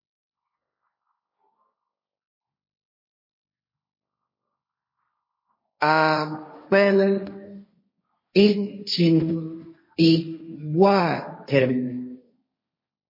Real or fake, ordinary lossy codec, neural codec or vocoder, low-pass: fake; MP3, 24 kbps; codec, 16 kHz, 1.1 kbps, Voila-Tokenizer; 5.4 kHz